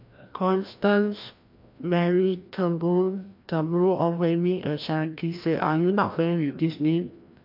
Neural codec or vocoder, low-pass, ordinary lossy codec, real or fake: codec, 16 kHz, 1 kbps, FreqCodec, larger model; 5.4 kHz; MP3, 48 kbps; fake